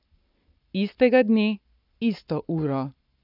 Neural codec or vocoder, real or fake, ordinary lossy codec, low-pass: codec, 44.1 kHz, 7.8 kbps, Pupu-Codec; fake; none; 5.4 kHz